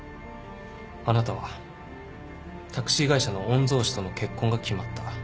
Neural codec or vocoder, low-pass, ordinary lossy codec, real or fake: none; none; none; real